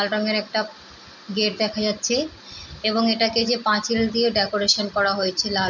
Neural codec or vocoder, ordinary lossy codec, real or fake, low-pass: none; none; real; 7.2 kHz